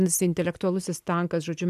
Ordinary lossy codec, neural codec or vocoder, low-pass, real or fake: AAC, 96 kbps; none; 14.4 kHz; real